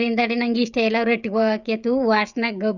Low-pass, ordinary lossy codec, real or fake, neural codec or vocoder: 7.2 kHz; none; fake; codec, 16 kHz, 16 kbps, FreqCodec, smaller model